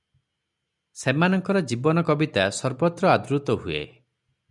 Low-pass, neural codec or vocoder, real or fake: 10.8 kHz; none; real